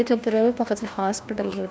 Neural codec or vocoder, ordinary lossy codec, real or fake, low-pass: codec, 16 kHz, 1 kbps, FunCodec, trained on LibriTTS, 50 frames a second; none; fake; none